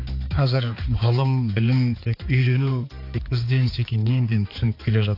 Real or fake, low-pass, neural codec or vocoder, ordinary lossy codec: fake; 5.4 kHz; codec, 16 kHz, 4 kbps, X-Codec, HuBERT features, trained on balanced general audio; AAC, 32 kbps